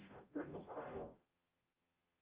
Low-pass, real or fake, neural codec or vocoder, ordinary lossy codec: 3.6 kHz; fake; codec, 44.1 kHz, 0.9 kbps, DAC; Opus, 24 kbps